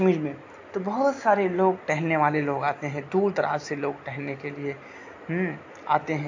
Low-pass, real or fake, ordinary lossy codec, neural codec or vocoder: 7.2 kHz; real; AAC, 48 kbps; none